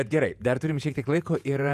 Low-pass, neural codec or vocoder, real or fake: 14.4 kHz; vocoder, 48 kHz, 128 mel bands, Vocos; fake